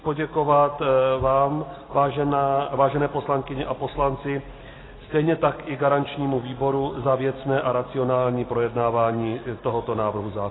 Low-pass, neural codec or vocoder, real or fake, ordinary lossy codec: 7.2 kHz; none; real; AAC, 16 kbps